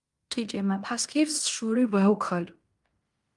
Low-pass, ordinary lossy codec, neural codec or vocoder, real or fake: 10.8 kHz; Opus, 32 kbps; codec, 16 kHz in and 24 kHz out, 0.9 kbps, LongCat-Audio-Codec, fine tuned four codebook decoder; fake